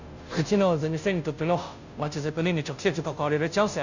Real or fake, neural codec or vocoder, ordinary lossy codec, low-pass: fake; codec, 16 kHz, 0.5 kbps, FunCodec, trained on Chinese and English, 25 frames a second; none; 7.2 kHz